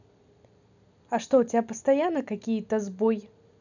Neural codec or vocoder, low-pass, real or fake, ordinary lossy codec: none; 7.2 kHz; real; none